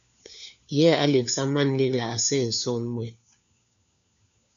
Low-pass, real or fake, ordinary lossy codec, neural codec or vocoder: 7.2 kHz; fake; MP3, 96 kbps; codec, 16 kHz, 4 kbps, FunCodec, trained on LibriTTS, 50 frames a second